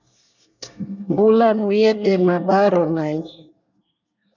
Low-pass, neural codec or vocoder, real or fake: 7.2 kHz; codec, 24 kHz, 1 kbps, SNAC; fake